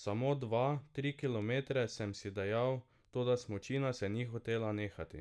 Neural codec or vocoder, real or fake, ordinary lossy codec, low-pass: none; real; none; none